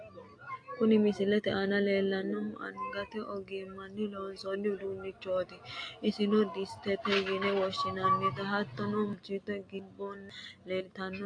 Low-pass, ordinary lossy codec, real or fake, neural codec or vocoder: 9.9 kHz; AAC, 48 kbps; real; none